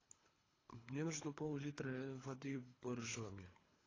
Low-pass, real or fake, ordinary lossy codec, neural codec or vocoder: 7.2 kHz; fake; AAC, 32 kbps; codec, 24 kHz, 3 kbps, HILCodec